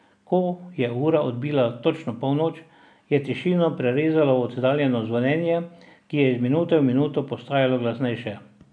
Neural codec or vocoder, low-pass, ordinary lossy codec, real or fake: none; 9.9 kHz; none; real